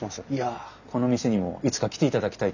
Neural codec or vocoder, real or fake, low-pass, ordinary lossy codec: none; real; 7.2 kHz; none